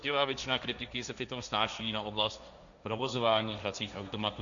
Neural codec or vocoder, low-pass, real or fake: codec, 16 kHz, 1.1 kbps, Voila-Tokenizer; 7.2 kHz; fake